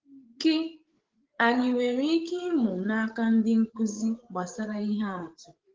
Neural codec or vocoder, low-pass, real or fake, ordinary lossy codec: codec, 16 kHz, 8 kbps, FreqCodec, larger model; 7.2 kHz; fake; Opus, 16 kbps